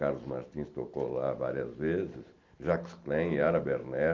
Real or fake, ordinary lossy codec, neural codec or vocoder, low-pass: real; Opus, 24 kbps; none; 7.2 kHz